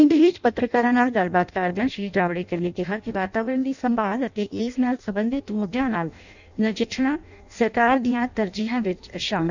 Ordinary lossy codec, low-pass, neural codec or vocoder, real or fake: none; 7.2 kHz; codec, 16 kHz in and 24 kHz out, 0.6 kbps, FireRedTTS-2 codec; fake